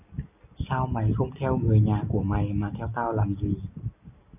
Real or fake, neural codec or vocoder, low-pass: real; none; 3.6 kHz